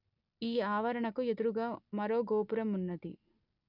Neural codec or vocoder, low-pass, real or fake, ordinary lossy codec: none; 5.4 kHz; real; none